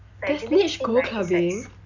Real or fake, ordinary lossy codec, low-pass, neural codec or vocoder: real; none; 7.2 kHz; none